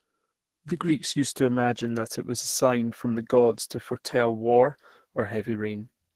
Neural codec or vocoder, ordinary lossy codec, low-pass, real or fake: codec, 32 kHz, 1.9 kbps, SNAC; Opus, 16 kbps; 14.4 kHz; fake